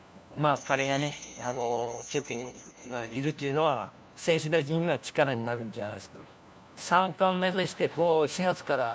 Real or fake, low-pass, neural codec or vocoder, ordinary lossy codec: fake; none; codec, 16 kHz, 1 kbps, FunCodec, trained on LibriTTS, 50 frames a second; none